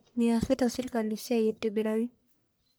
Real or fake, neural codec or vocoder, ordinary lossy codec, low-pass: fake; codec, 44.1 kHz, 1.7 kbps, Pupu-Codec; none; none